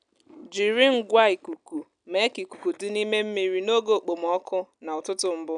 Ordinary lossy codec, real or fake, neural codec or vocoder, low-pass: none; real; none; 9.9 kHz